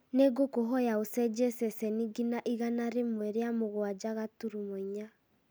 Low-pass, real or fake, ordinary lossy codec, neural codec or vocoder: none; real; none; none